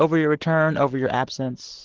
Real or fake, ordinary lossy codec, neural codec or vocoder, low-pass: real; Opus, 16 kbps; none; 7.2 kHz